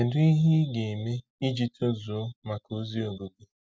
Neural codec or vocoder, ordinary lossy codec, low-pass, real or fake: none; none; none; real